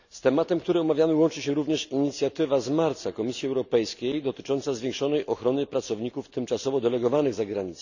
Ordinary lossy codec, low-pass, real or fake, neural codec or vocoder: none; 7.2 kHz; real; none